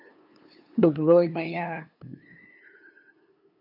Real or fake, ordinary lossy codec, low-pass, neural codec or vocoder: fake; AAC, 48 kbps; 5.4 kHz; codec, 16 kHz, 2 kbps, FunCodec, trained on LibriTTS, 25 frames a second